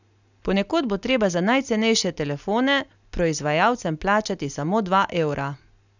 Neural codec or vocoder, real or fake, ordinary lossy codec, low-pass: none; real; none; 7.2 kHz